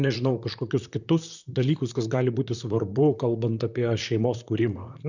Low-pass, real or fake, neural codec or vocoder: 7.2 kHz; fake; vocoder, 44.1 kHz, 128 mel bands, Pupu-Vocoder